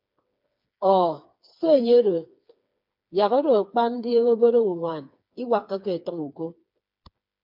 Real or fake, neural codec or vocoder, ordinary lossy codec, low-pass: fake; codec, 16 kHz, 4 kbps, FreqCodec, smaller model; MP3, 48 kbps; 5.4 kHz